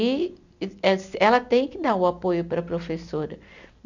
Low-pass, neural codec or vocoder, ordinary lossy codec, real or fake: 7.2 kHz; none; none; real